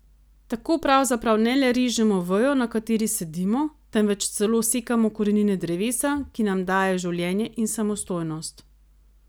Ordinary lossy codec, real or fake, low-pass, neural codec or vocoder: none; real; none; none